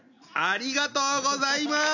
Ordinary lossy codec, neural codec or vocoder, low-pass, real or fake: none; none; 7.2 kHz; real